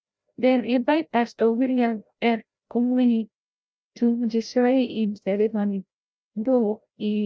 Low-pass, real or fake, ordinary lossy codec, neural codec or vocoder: none; fake; none; codec, 16 kHz, 0.5 kbps, FreqCodec, larger model